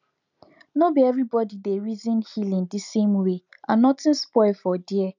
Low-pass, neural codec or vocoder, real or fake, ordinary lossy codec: 7.2 kHz; none; real; none